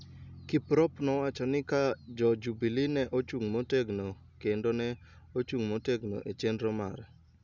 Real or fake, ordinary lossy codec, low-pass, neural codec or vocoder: real; none; 7.2 kHz; none